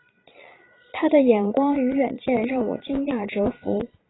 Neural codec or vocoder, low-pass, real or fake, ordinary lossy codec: vocoder, 22.05 kHz, 80 mel bands, Vocos; 7.2 kHz; fake; AAC, 16 kbps